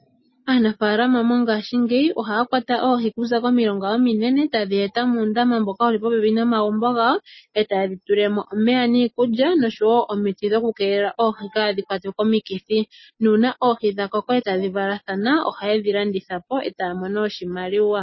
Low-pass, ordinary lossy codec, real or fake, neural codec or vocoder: 7.2 kHz; MP3, 24 kbps; real; none